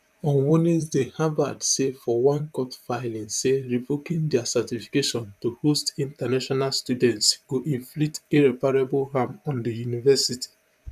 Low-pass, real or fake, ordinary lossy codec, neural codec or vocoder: 14.4 kHz; fake; none; vocoder, 44.1 kHz, 128 mel bands, Pupu-Vocoder